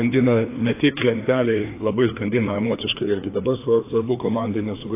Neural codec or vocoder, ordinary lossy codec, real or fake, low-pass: codec, 16 kHz, 2 kbps, FreqCodec, larger model; AAC, 24 kbps; fake; 3.6 kHz